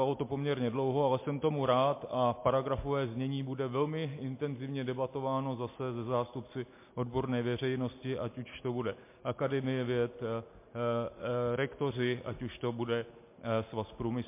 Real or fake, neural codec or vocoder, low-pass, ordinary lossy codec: real; none; 3.6 kHz; MP3, 24 kbps